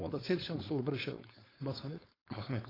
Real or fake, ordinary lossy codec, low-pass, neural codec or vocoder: fake; AAC, 24 kbps; 5.4 kHz; codec, 16 kHz, 4.8 kbps, FACodec